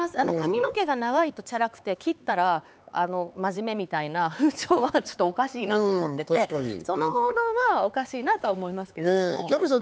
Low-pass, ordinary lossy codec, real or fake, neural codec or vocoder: none; none; fake; codec, 16 kHz, 4 kbps, X-Codec, HuBERT features, trained on LibriSpeech